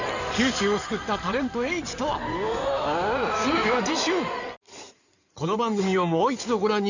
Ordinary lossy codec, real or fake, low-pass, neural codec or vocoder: none; fake; 7.2 kHz; codec, 16 kHz in and 24 kHz out, 2.2 kbps, FireRedTTS-2 codec